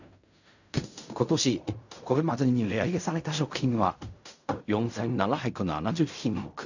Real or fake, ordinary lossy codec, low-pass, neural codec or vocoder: fake; none; 7.2 kHz; codec, 16 kHz in and 24 kHz out, 0.4 kbps, LongCat-Audio-Codec, fine tuned four codebook decoder